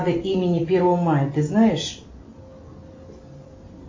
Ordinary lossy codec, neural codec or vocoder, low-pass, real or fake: MP3, 32 kbps; none; 7.2 kHz; real